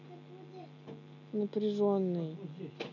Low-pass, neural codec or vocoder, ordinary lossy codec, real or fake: 7.2 kHz; none; none; real